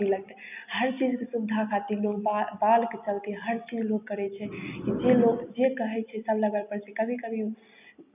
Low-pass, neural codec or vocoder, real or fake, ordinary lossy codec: 3.6 kHz; none; real; none